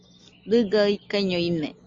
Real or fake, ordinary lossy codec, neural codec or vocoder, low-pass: real; Opus, 32 kbps; none; 7.2 kHz